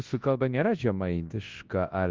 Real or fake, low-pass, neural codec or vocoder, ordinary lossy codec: fake; 7.2 kHz; codec, 24 kHz, 0.9 kbps, WavTokenizer, large speech release; Opus, 16 kbps